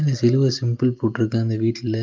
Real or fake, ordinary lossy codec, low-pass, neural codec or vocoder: real; Opus, 24 kbps; 7.2 kHz; none